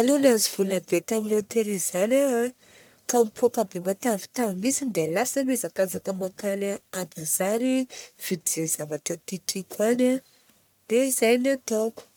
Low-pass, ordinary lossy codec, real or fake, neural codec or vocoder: none; none; fake; codec, 44.1 kHz, 1.7 kbps, Pupu-Codec